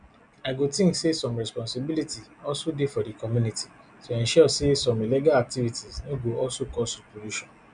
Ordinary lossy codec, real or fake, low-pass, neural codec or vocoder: none; real; 9.9 kHz; none